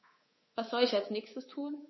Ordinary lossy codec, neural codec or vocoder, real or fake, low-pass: MP3, 24 kbps; codec, 24 kHz, 3.1 kbps, DualCodec; fake; 7.2 kHz